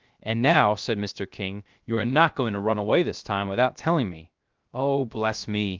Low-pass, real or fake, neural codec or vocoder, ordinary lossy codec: 7.2 kHz; fake; codec, 16 kHz, 0.7 kbps, FocalCodec; Opus, 32 kbps